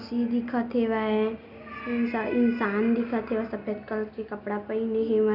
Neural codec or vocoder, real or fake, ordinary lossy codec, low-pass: none; real; none; 5.4 kHz